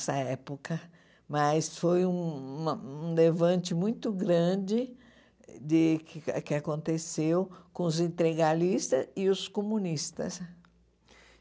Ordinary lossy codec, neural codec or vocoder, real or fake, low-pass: none; none; real; none